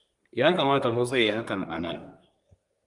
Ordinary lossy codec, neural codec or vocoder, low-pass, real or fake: Opus, 32 kbps; codec, 24 kHz, 1 kbps, SNAC; 10.8 kHz; fake